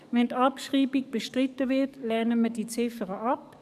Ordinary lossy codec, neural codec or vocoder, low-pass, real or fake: none; codec, 44.1 kHz, 7.8 kbps, DAC; 14.4 kHz; fake